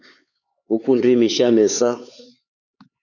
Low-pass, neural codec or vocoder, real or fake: 7.2 kHz; codec, 16 kHz, 4 kbps, X-Codec, HuBERT features, trained on LibriSpeech; fake